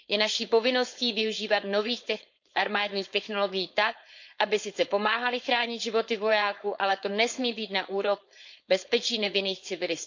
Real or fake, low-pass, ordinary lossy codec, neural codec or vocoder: fake; 7.2 kHz; MP3, 64 kbps; codec, 16 kHz, 4.8 kbps, FACodec